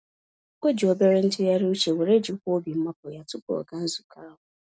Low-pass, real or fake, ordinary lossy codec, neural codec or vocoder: none; real; none; none